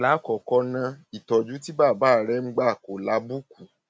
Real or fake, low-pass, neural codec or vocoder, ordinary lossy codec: real; none; none; none